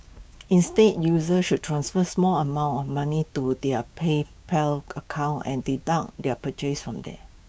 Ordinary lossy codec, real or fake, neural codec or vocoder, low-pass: none; fake; codec, 16 kHz, 6 kbps, DAC; none